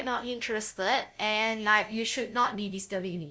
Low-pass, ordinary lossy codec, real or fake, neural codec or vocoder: none; none; fake; codec, 16 kHz, 0.5 kbps, FunCodec, trained on LibriTTS, 25 frames a second